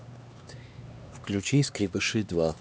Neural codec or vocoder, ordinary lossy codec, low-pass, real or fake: codec, 16 kHz, 2 kbps, X-Codec, HuBERT features, trained on LibriSpeech; none; none; fake